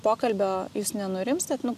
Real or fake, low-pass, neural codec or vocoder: real; 14.4 kHz; none